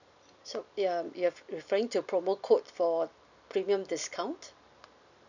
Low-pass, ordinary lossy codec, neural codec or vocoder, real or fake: 7.2 kHz; none; none; real